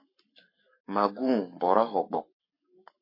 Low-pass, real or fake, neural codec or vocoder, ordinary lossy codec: 5.4 kHz; fake; codec, 44.1 kHz, 7.8 kbps, Pupu-Codec; MP3, 24 kbps